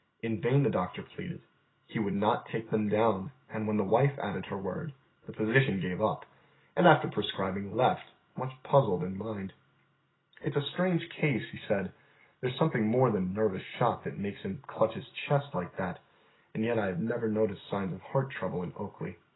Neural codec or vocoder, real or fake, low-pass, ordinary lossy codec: none; real; 7.2 kHz; AAC, 16 kbps